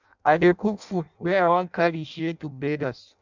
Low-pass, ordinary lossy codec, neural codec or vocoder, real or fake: 7.2 kHz; none; codec, 16 kHz in and 24 kHz out, 0.6 kbps, FireRedTTS-2 codec; fake